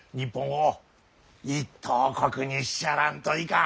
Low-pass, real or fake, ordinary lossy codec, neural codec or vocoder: none; real; none; none